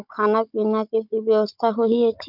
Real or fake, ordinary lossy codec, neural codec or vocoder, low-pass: fake; none; codec, 16 kHz, 16 kbps, FunCodec, trained on Chinese and English, 50 frames a second; 5.4 kHz